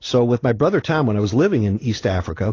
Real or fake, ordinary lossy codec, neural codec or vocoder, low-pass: real; AAC, 32 kbps; none; 7.2 kHz